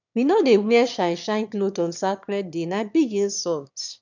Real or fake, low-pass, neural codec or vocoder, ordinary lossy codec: fake; 7.2 kHz; autoencoder, 22.05 kHz, a latent of 192 numbers a frame, VITS, trained on one speaker; none